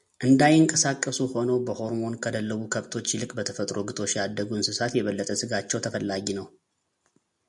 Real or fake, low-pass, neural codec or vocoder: real; 10.8 kHz; none